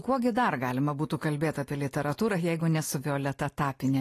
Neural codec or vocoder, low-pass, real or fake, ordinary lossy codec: none; 14.4 kHz; real; AAC, 48 kbps